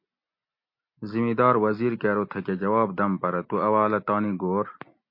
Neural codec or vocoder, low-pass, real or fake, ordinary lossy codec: none; 5.4 kHz; real; MP3, 32 kbps